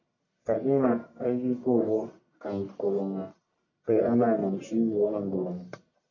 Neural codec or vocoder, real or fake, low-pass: codec, 44.1 kHz, 1.7 kbps, Pupu-Codec; fake; 7.2 kHz